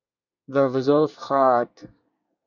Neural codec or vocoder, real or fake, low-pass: codec, 24 kHz, 1 kbps, SNAC; fake; 7.2 kHz